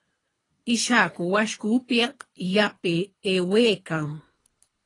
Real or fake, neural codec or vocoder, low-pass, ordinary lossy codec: fake; codec, 24 kHz, 3 kbps, HILCodec; 10.8 kHz; AAC, 32 kbps